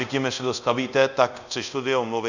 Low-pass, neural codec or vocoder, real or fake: 7.2 kHz; codec, 24 kHz, 0.5 kbps, DualCodec; fake